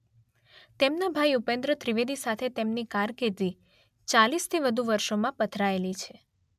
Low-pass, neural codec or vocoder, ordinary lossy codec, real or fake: 14.4 kHz; none; MP3, 96 kbps; real